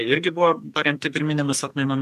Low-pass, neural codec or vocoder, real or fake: 14.4 kHz; codec, 44.1 kHz, 2.6 kbps, SNAC; fake